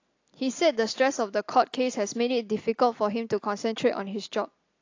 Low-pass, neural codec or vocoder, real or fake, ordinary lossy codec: 7.2 kHz; none; real; AAC, 48 kbps